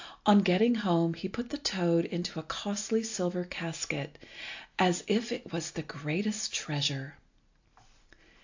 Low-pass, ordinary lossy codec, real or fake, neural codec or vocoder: 7.2 kHz; AAC, 48 kbps; real; none